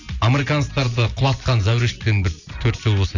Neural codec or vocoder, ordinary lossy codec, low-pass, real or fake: none; none; 7.2 kHz; real